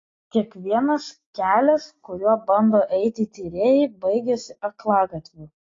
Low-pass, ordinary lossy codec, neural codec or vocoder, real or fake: 7.2 kHz; AAC, 32 kbps; none; real